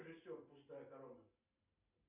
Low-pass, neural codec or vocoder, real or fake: 3.6 kHz; none; real